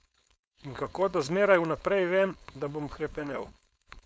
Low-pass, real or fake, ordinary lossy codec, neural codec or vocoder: none; fake; none; codec, 16 kHz, 4.8 kbps, FACodec